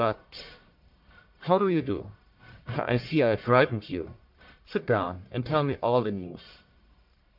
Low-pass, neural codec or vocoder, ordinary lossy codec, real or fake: 5.4 kHz; codec, 44.1 kHz, 1.7 kbps, Pupu-Codec; MP3, 48 kbps; fake